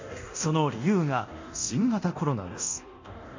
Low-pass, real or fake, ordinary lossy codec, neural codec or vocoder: 7.2 kHz; fake; MP3, 64 kbps; codec, 24 kHz, 0.9 kbps, DualCodec